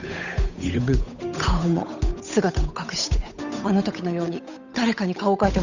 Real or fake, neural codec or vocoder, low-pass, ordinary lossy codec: fake; codec, 16 kHz, 8 kbps, FunCodec, trained on Chinese and English, 25 frames a second; 7.2 kHz; none